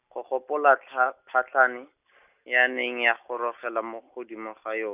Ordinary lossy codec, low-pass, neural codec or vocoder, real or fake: none; 3.6 kHz; none; real